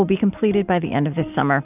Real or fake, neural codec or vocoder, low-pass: real; none; 3.6 kHz